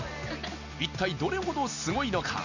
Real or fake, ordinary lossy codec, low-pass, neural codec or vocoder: real; none; 7.2 kHz; none